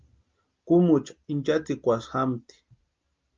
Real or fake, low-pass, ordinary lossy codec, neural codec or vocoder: real; 7.2 kHz; Opus, 24 kbps; none